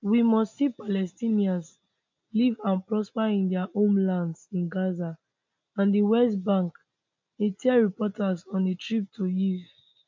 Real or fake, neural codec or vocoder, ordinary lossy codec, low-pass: real; none; MP3, 64 kbps; 7.2 kHz